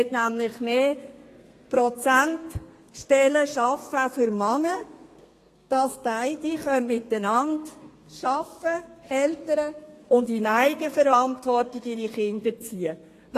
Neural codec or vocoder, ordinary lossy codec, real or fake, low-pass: codec, 32 kHz, 1.9 kbps, SNAC; AAC, 48 kbps; fake; 14.4 kHz